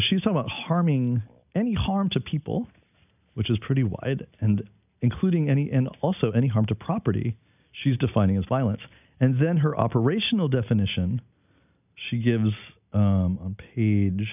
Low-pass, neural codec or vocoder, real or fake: 3.6 kHz; none; real